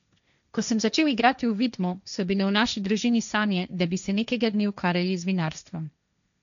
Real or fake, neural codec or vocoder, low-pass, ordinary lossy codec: fake; codec, 16 kHz, 1.1 kbps, Voila-Tokenizer; 7.2 kHz; none